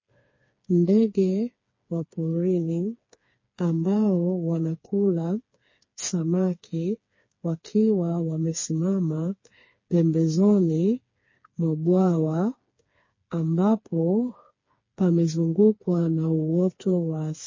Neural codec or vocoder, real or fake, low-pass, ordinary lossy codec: codec, 16 kHz, 4 kbps, FreqCodec, smaller model; fake; 7.2 kHz; MP3, 32 kbps